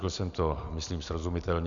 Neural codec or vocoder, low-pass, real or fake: none; 7.2 kHz; real